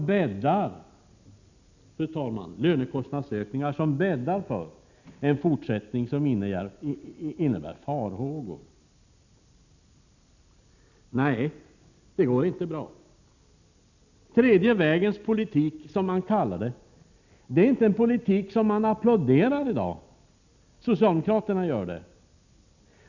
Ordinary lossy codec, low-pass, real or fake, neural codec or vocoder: none; 7.2 kHz; real; none